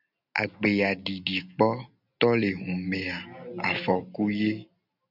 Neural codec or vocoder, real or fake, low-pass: none; real; 5.4 kHz